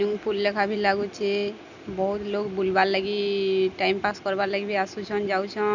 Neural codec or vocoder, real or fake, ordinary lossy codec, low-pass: none; real; none; 7.2 kHz